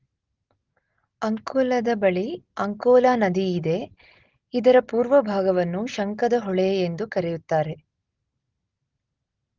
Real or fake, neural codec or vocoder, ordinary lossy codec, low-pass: real; none; Opus, 16 kbps; 7.2 kHz